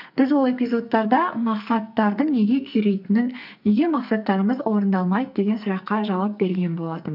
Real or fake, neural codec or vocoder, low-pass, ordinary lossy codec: fake; codec, 44.1 kHz, 2.6 kbps, SNAC; 5.4 kHz; none